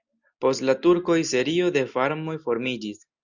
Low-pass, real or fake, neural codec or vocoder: 7.2 kHz; real; none